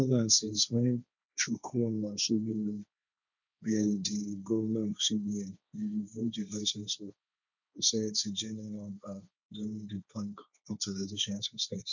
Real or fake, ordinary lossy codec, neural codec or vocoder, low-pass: fake; none; codec, 16 kHz, 1.1 kbps, Voila-Tokenizer; 7.2 kHz